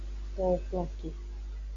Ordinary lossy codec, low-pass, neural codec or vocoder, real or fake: AAC, 64 kbps; 7.2 kHz; none; real